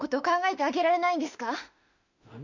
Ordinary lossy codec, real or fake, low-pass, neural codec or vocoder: none; fake; 7.2 kHz; autoencoder, 48 kHz, 32 numbers a frame, DAC-VAE, trained on Japanese speech